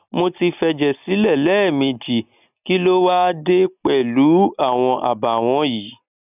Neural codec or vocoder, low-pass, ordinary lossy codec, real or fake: none; 3.6 kHz; none; real